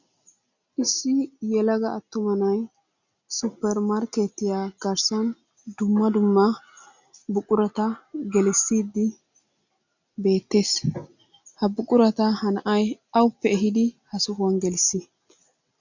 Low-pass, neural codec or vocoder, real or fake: 7.2 kHz; none; real